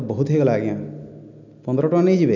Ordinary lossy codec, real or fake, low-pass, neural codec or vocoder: none; real; 7.2 kHz; none